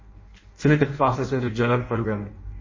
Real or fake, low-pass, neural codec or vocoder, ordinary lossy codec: fake; 7.2 kHz; codec, 16 kHz in and 24 kHz out, 0.6 kbps, FireRedTTS-2 codec; MP3, 32 kbps